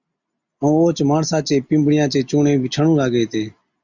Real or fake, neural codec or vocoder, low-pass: real; none; 7.2 kHz